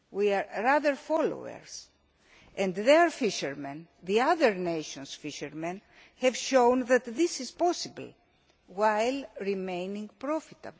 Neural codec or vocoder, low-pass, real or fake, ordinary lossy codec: none; none; real; none